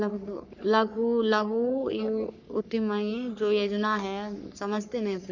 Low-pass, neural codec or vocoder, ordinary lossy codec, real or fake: 7.2 kHz; codec, 44.1 kHz, 3.4 kbps, Pupu-Codec; none; fake